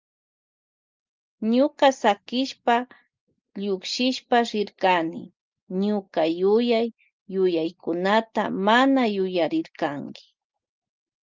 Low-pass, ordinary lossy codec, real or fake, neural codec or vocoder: 7.2 kHz; Opus, 32 kbps; real; none